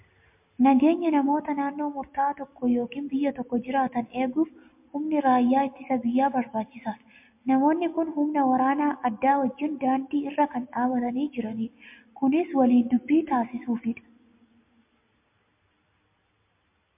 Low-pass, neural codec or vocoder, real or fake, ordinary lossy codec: 3.6 kHz; none; real; MP3, 32 kbps